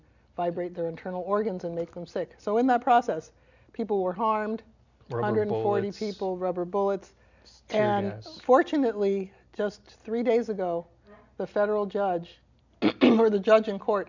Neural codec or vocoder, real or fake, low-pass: none; real; 7.2 kHz